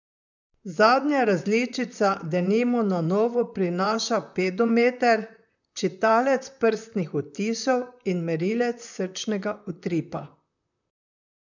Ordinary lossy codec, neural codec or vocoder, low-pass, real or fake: none; vocoder, 44.1 kHz, 128 mel bands, Pupu-Vocoder; 7.2 kHz; fake